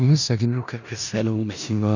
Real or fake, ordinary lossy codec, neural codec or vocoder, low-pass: fake; none; codec, 16 kHz in and 24 kHz out, 0.4 kbps, LongCat-Audio-Codec, four codebook decoder; 7.2 kHz